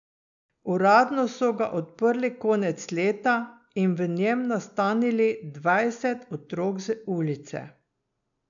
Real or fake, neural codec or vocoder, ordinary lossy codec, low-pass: real; none; none; 7.2 kHz